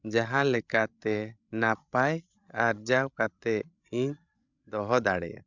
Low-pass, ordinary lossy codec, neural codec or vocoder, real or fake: 7.2 kHz; none; codec, 16 kHz, 16 kbps, FreqCodec, larger model; fake